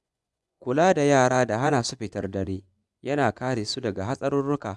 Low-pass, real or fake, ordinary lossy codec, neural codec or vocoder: none; fake; none; vocoder, 24 kHz, 100 mel bands, Vocos